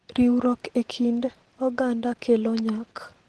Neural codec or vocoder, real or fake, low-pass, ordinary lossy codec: none; real; 10.8 kHz; Opus, 16 kbps